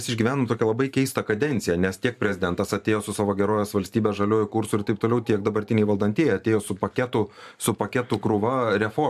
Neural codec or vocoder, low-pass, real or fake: vocoder, 44.1 kHz, 128 mel bands every 512 samples, BigVGAN v2; 14.4 kHz; fake